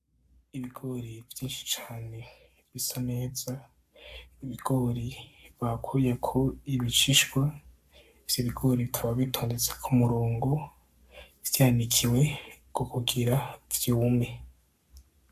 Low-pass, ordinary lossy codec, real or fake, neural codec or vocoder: 14.4 kHz; AAC, 96 kbps; fake; codec, 44.1 kHz, 7.8 kbps, Pupu-Codec